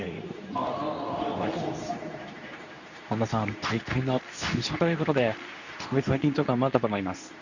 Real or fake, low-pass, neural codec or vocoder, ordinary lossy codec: fake; 7.2 kHz; codec, 24 kHz, 0.9 kbps, WavTokenizer, medium speech release version 2; none